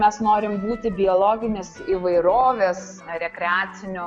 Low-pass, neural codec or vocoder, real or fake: 9.9 kHz; none; real